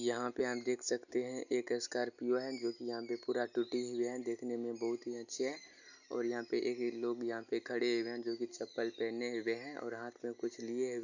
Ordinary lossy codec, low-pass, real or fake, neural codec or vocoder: none; 7.2 kHz; real; none